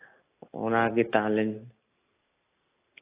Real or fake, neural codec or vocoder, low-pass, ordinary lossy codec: real; none; 3.6 kHz; AAC, 24 kbps